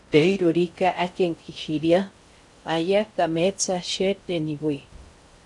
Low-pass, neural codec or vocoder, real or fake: 10.8 kHz; codec, 16 kHz in and 24 kHz out, 0.6 kbps, FocalCodec, streaming, 4096 codes; fake